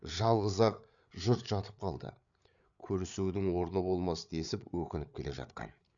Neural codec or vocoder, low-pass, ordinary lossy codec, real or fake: codec, 16 kHz, 8 kbps, FreqCodec, larger model; 7.2 kHz; none; fake